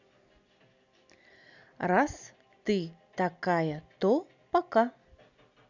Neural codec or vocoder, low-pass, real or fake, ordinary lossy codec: none; 7.2 kHz; real; none